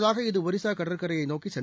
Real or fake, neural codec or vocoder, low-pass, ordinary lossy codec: real; none; none; none